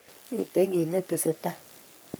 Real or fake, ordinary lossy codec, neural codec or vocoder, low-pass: fake; none; codec, 44.1 kHz, 3.4 kbps, Pupu-Codec; none